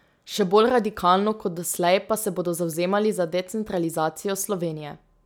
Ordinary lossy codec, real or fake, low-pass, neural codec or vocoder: none; real; none; none